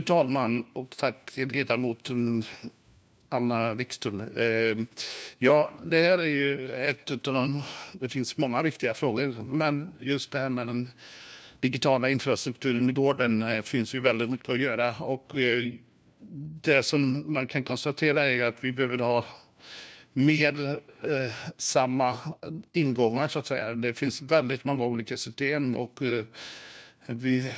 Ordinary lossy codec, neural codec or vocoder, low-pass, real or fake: none; codec, 16 kHz, 1 kbps, FunCodec, trained on LibriTTS, 50 frames a second; none; fake